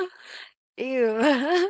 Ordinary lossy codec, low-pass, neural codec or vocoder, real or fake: none; none; codec, 16 kHz, 4.8 kbps, FACodec; fake